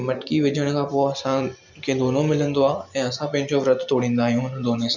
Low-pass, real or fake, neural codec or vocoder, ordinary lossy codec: 7.2 kHz; real; none; none